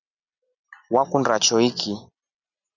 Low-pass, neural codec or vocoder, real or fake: 7.2 kHz; none; real